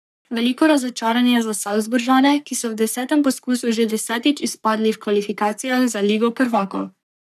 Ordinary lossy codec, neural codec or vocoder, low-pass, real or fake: none; codec, 44.1 kHz, 3.4 kbps, Pupu-Codec; 14.4 kHz; fake